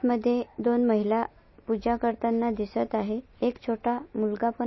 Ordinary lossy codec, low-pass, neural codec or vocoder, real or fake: MP3, 24 kbps; 7.2 kHz; none; real